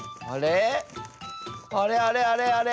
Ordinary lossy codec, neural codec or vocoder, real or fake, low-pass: none; none; real; none